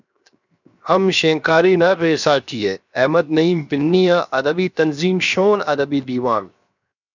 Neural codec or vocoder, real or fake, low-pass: codec, 16 kHz, 0.7 kbps, FocalCodec; fake; 7.2 kHz